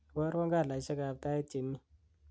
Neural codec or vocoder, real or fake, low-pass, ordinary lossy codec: none; real; none; none